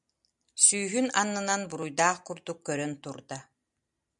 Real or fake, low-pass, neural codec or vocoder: real; 10.8 kHz; none